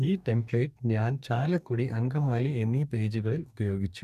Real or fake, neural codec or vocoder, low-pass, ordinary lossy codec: fake; codec, 44.1 kHz, 2.6 kbps, DAC; 14.4 kHz; none